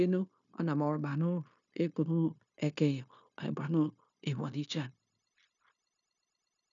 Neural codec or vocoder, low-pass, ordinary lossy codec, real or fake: codec, 16 kHz, 0.9 kbps, LongCat-Audio-Codec; 7.2 kHz; none; fake